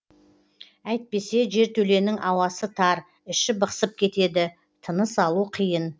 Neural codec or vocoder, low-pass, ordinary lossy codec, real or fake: none; none; none; real